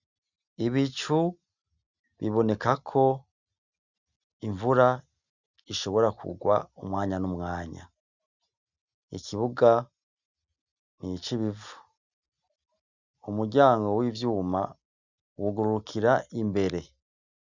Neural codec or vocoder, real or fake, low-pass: none; real; 7.2 kHz